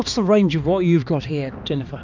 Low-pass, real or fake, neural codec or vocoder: 7.2 kHz; fake; codec, 16 kHz, 2 kbps, X-Codec, HuBERT features, trained on balanced general audio